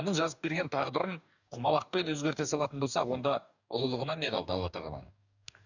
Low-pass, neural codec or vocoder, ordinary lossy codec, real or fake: 7.2 kHz; codec, 44.1 kHz, 2.6 kbps, DAC; none; fake